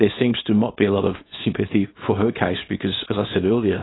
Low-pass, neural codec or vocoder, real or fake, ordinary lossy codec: 7.2 kHz; codec, 24 kHz, 0.9 kbps, WavTokenizer, small release; fake; AAC, 16 kbps